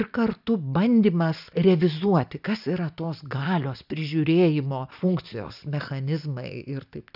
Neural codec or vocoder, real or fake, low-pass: none; real; 5.4 kHz